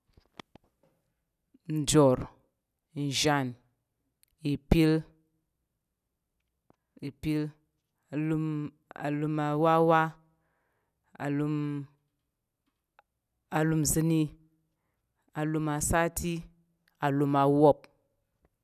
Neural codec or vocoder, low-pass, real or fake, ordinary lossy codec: none; 14.4 kHz; real; none